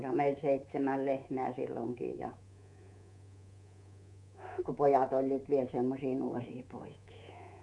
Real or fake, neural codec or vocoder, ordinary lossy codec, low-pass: fake; codec, 24 kHz, 3.1 kbps, DualCodec; none; 10.8 kHz